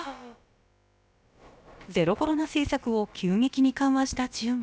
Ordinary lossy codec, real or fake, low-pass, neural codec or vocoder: none; fake; none; codec, 16 kHz, about 1 kbps, DyCAST, with the encoder's durations